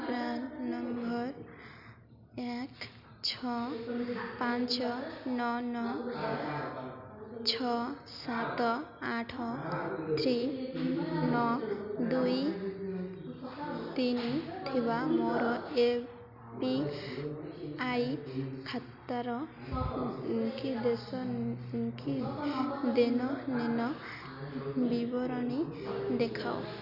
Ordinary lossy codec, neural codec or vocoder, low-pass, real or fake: none; none; 5.4 kHz; real